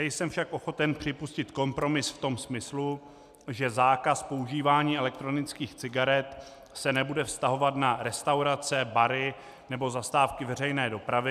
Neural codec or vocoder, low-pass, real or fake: none; 14.4 kHz; real